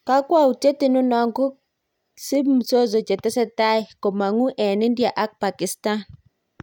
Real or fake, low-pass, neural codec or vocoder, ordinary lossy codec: real; 19.8 kHz; none; none